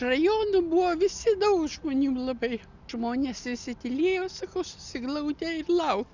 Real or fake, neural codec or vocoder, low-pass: real; none; 7.2 kHz